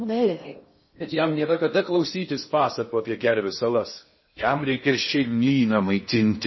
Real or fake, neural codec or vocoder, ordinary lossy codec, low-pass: fake; codec, 16 kHz in and 24 kHz out, 0.6 kbps, FocalCodec, streaming, 4096 codes; MP3, 24 kbps; 7.2 kHz